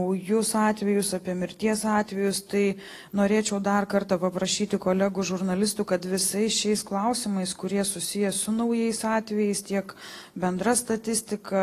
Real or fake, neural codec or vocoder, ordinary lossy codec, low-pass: real; none; AAC, 48 kbps; 14.4 kHz